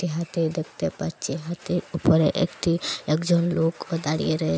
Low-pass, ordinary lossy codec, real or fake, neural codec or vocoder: none; none; real; none